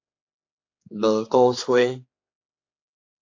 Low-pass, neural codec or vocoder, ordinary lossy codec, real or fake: 7.2 kHz; codec, 16 kHz, 4 kbps, X-Codec, HuBERT features, trained on general audio; AAC, 48 kbps; fake